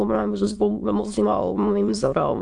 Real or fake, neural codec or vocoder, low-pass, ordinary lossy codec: fake; autoencoder, 22.05 kHz, a latent of 192 numbers a frame, VITS, trained on many speakers; 9.9 kHz; AAC, 64 kbps